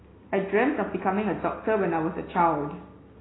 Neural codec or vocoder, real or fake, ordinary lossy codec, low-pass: none; real; AAC, 16 kbps; 7.2 kHz